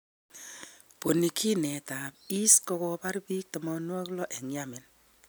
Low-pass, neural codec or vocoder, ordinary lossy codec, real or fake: none; none; none; real